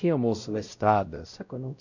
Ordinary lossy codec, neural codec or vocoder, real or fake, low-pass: none; codec, 16 kHz, 0.5 kbps, X-Codec, WavLM features, trained on Multilingual LibriSpeech; fake; 7.2 kHz